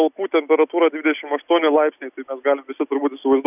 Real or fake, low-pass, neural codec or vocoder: real; 3.6 kHz; none